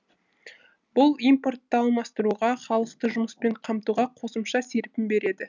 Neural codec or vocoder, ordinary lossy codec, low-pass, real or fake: none; none; 7.2 kHz; real